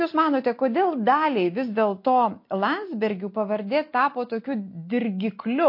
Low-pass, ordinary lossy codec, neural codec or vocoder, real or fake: 5.4 kHz; MP3, 32 kbps; none; real